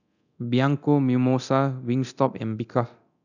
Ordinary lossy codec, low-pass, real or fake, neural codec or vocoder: none; 7.2 kHz; fake; codec, 24 kHz, 0.9 kbps, DualCodec